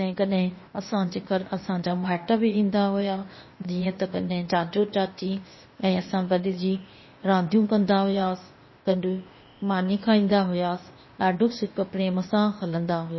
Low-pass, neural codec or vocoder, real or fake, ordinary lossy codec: 7.2 kHz; codec, 16 kHz, 0.8 kbps, ZipCodec; fake; MP3, 24 kbps